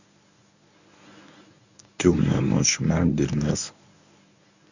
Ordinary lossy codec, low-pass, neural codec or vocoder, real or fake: none; 7.2 kHz; codec, 24 kHz, 0.9 kbps, WavTokenizer, medium speech release version 1; fake